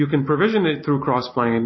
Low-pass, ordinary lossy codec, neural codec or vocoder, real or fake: 7.2 kHz; MP3, 24 kbps; none; real